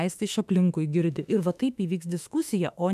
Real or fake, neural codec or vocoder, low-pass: fake; autoencoder, 48 kHz, 32 numbers a frame, DAC-VAE, trained on Japanese speech; 14.4 kHz